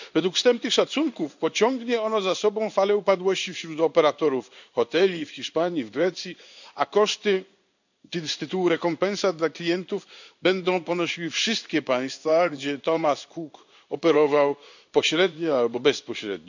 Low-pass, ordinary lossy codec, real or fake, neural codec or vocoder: 7.2 kHz; none; fake; codec, 16 kHz in and 24 kHz out, 1 kbps, XY-Tokenizer